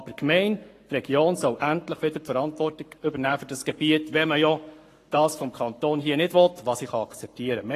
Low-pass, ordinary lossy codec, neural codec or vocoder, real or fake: 14.4 kHz; AAC, 48 kbps; codec, 44.1 kHz, 7.8 kbps, Pupu-Codec; fake